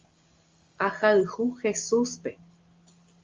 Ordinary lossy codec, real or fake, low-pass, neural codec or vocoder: Opus, 32 kbps; real; 7.2 kHz; none